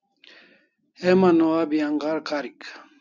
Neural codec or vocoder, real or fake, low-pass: none; real; 7.2 kHz